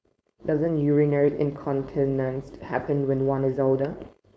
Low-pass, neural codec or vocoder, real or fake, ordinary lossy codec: none; codec, 16 kHz, 4.8 kbps, FACodec; fake; none